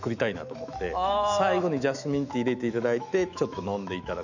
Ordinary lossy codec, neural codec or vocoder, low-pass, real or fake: none; autoencoder, 48 kHz, 128 numbers a frame, DAC-VAE, trained on Japanese speech; 7.2 kHz; fake